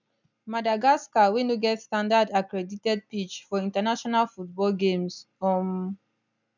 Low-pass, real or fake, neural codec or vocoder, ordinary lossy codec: 7.2 kHz; real; none; none